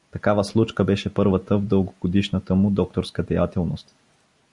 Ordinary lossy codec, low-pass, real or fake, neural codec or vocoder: Opus, 64 kbps; 10.8 kHz; real; none